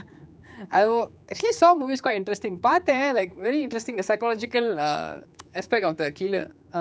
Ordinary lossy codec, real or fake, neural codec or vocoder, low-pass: none; fake; codec, 16 kHz, 4 kbps, X-Codec, HuBERT features, trained on general audio; none